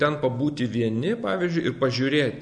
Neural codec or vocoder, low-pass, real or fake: none; 9.9 kHz; real